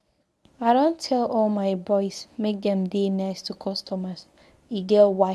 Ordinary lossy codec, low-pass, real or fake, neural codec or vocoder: none; none; fake; codec, 24 kHz, 0.9 kbps, WavTokenizer, medium speech release version 1